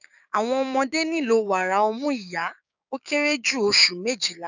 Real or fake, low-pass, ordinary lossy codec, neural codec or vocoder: fake; 7.2 kHz; none; codec, 16 kHz, 6 kbps, DAC